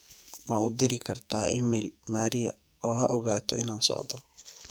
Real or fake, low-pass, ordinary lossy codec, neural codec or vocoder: fake; none; none; codec, 44.1 kHz, 2.6 kbps, SNAC